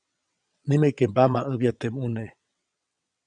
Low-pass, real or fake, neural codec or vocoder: 9.9 kHz; fake; vocoder, 22.05 kHz, 80 mel bands, WaveNeXt